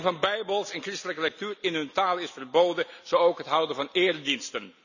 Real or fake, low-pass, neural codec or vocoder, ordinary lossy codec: real; 7.2 kHz; none; none